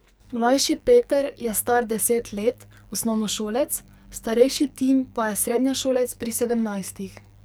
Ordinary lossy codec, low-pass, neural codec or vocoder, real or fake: none; none; codec, 44.1 kHz, 2.6 kbps, SNAC; fake